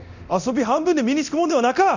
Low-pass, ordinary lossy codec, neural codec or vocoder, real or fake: 7.2 kHz; none; codec, 24 kHz, 0.9 kbps, DualCodec; fake